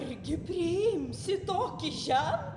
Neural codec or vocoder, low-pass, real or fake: none; 10.8 kHz; real